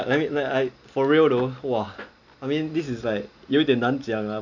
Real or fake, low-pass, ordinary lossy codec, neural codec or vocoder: real; 7.2 kHz; none; none